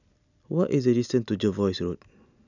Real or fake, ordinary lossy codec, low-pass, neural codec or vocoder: real; none; 7.2 kHz; none